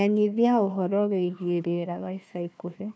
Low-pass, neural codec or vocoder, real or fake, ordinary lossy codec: none; codec, 16 kHz, 1 kbps, FunCodec, trained on Chinese and English, 50 frames a second; fake; none